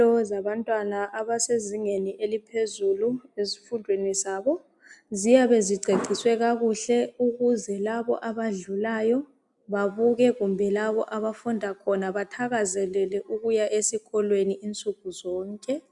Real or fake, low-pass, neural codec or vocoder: real; 10.8 kHz; none